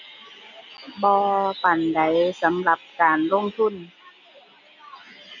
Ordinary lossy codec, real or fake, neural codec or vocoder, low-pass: none; real; none; 7.2 kHz